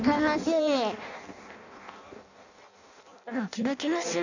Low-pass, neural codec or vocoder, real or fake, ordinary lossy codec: 7.2 kHz; codec, 16 kHz in and 24 kHz out, 0.6 kbps, FireRedTTS-2 codec; fake; none